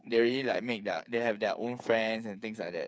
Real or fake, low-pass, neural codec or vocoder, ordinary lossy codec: fake; none; codec, 16 kHz, 8 kbps, FreqCodec, smaller model; none